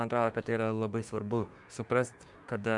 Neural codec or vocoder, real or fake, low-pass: codec, 24 kHz, 1 kbps, SNAC; fake; 10.8 kHz